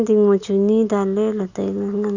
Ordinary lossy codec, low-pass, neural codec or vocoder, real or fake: Opus, 64 kbps; 7.2 kHz; none; real